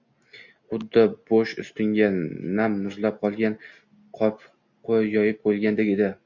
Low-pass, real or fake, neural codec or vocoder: 7.2 kHz; real; none